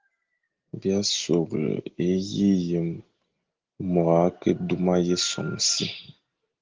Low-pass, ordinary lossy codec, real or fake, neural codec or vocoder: 7.2 kHz; Opus, 16 kbps; real; none